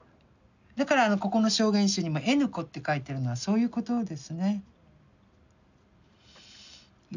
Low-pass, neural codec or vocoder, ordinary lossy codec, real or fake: 7.2 kHz; none; none; real